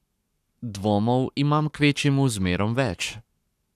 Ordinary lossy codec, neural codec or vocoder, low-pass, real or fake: none; codec, 44.1 kHz, 7.8 kbps, Pupu-Codec; 14.4 kHz; fake